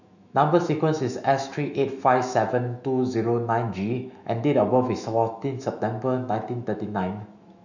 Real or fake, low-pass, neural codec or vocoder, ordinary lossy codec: fake; 7.2 kHz; autoencoder, 48 kHz, 128 numbers a frame, DAC-VAE, trained on Japanese speech; none